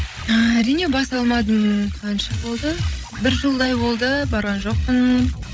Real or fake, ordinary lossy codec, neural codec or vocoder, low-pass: fake; none; codec, 16 kHz, 16 kbps, FreqCodec, larger model; none